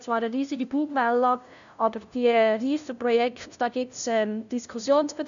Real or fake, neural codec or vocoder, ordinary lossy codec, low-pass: fake; codec, 16 kHz, 0.5 kbps, FunCodec, trained on LibriTTS, 25 frames a second; none; 7.2 kHz